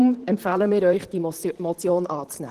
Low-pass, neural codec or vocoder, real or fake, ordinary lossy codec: 14.4 kHz; vocoder, 44.1 kHz, 128 mel bands, Pupu-Vocoder; fake; Opus, 16 kbps